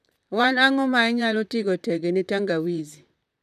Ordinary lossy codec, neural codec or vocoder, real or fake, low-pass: none; vocoder, 44.1 kHz, 128 mel bands, Pupu-Vocoder; fake; 14.4 kHz